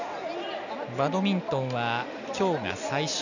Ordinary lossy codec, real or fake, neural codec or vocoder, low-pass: none; real; none; 7.2 kHz